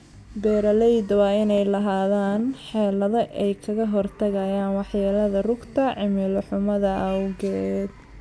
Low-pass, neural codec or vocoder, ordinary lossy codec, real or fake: none; none; none; real